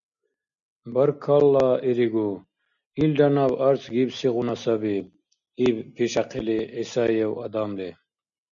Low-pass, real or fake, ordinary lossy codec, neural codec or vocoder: 7.2 kHz; real; MP3, 96 kbps; none